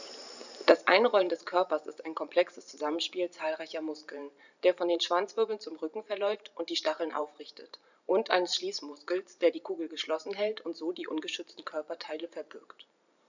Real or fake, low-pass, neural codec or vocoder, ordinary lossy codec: fake; 7.2 kHz; vocoder, 44.1 kHz, 128 mel bands every 512 samples, BigVGAN v2; none